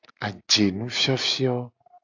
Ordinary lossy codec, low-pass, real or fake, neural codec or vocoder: AAC, 48 kbps; 7.2 kHz; real; none